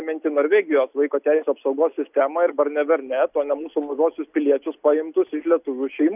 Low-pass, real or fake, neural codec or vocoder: 3.6 kHz; real; none